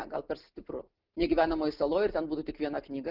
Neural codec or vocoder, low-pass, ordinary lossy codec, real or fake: none; 5.4 kHz; Opus, 32 kbps; real